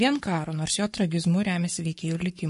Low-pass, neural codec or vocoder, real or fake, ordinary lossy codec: 14.4 kHz; codec, 44.1 kHz, 7.8 kbps, Pupu-Codec; fake; MP3, 48 kbps